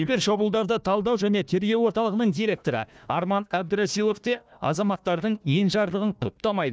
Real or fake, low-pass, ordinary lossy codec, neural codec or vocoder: fake; none; none; codec, 16 kHz, 1 kbps, FunCodec, trained on Chinese and English, 50 frames a second